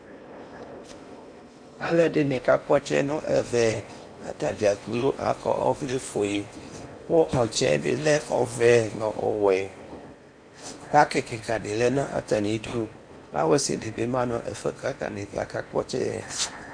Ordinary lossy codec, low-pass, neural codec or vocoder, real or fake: AAC, 64 kbps; 9.9 kHz; codec, 16 kHz in and 24 kHz out, 0.8 kbps, FocalCodec, streaming, 65536 codes; fake